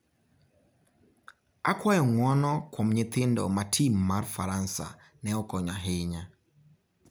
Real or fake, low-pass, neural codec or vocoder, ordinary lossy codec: real; none; none; none